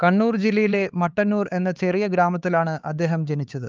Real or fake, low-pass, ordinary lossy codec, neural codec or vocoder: fake; 7.2 kHz; Opus, 24 kbps; codec, 16 kHz, 4 kbps, X-Codec, HuBERT features, trained on LibriSpeech